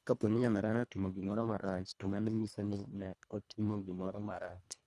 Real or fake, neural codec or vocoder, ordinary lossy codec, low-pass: fake; codec, 24 kHz, 1.5 kbps, HILCodec; none; none